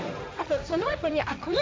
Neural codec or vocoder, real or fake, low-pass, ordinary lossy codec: codec, 16 kHz, 1.1 kbps, Voila-Tokenizer; fake; none; none